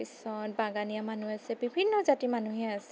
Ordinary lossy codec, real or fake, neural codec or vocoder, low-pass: none; real; none; none